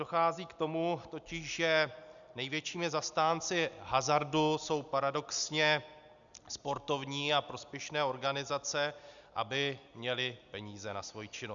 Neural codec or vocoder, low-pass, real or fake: none; 7.2 kHz; real